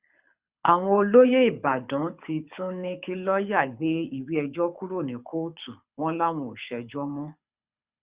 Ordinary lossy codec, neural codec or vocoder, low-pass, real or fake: Opus, 64 kbps; codec, 24 kHz, 6 kbps, HILCodec; 3.6 kHz; fake